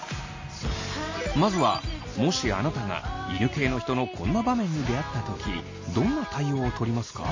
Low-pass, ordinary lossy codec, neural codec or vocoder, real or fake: 7.2 kHz; MP3, 32 kbps; none; real